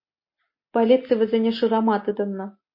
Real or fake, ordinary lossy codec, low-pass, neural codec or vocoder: real; MP3, 32 kbps; 5.4 kHz; none